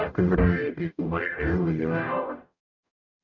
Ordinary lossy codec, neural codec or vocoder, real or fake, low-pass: none; codec, 44.1 kHz, 0.9 kbps, DAC; fake; 7.2 kHz